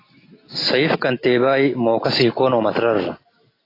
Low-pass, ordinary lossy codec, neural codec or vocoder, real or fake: 5.4 kHz; AAC, 24 kbps; none; real